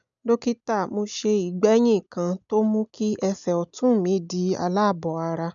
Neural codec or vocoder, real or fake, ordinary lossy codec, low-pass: none; real; none; 7.2 kHz